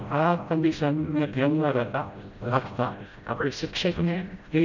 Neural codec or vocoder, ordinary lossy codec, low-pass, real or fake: codec, 16 kHz, 0.5 kbps, FreqCodec, smaller model; none; 7.2 kHz; fake